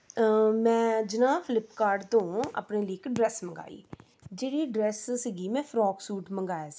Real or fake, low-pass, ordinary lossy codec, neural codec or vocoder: real; none; none; none